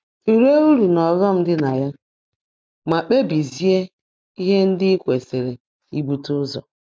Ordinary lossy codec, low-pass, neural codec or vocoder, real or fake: none; none; none; real